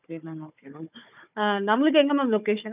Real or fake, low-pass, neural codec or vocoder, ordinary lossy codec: fake; 3.6 kHz; codec, 16 kHz, 4 kbps, FunCodec, trained on Chinese and English, 50 frames a second; none